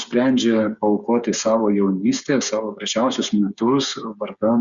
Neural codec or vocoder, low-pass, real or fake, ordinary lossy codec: codec, 16 kHz, 8 kbps, FreqCodec, smaller model; 7.2 kHz; fake; Opus, 64 kbps